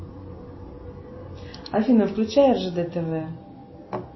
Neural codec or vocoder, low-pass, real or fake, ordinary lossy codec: none; 7.2 kHz; real; MP3, 24 kbps